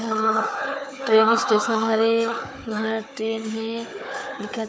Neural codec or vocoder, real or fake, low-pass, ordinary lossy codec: codec, 16 kHz, 4 kbps, FunCodec, trained on Chinese and English, 50 frames a second; fake; none; none